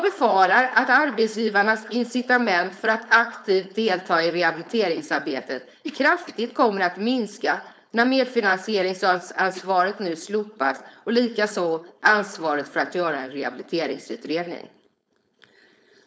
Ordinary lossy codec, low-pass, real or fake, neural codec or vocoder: none; none; fake; codec, 16 kHz, 4.8 kbps, FACodec